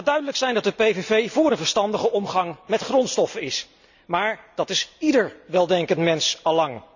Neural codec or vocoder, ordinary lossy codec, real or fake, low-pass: none; none; real; 7.2 kHz